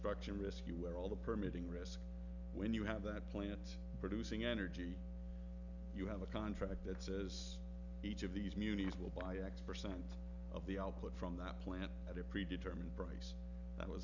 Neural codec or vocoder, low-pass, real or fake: none; 7.2 kHz; real